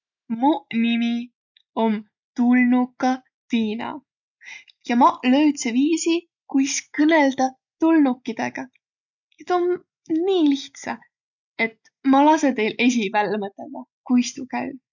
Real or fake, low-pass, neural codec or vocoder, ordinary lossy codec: real; 7.2 kHz; none; none